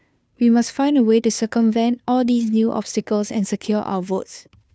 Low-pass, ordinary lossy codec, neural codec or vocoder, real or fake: none; none; codec, 16 kHz, 2 kbps, FunCodec, trained on Chinese and English, 25 frames a second; fake